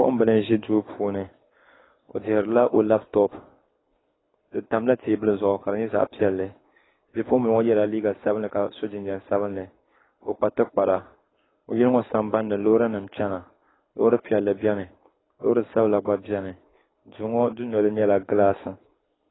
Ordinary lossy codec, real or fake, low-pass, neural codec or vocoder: AAC, 16 kbps; fake; 7.2 kHz; codec, 24 kHz, 6 kbps, HILCodec